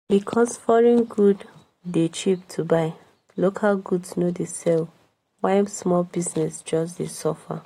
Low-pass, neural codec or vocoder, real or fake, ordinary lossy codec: 19.8 kHz; none; real; AAC, 48 kbps